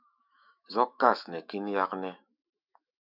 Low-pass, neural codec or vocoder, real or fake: 5.4 kHz; autoencoder, 48 kHz, 128 numbers a frame, DAC-VAE, trained on Japanese speech; fake